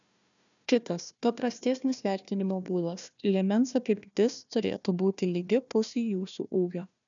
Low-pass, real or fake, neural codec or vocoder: 7.2 kHz; fake; codec, 16 kHz, 1 kbps, FunCodec, trained on Chinese and English, 50 frames a second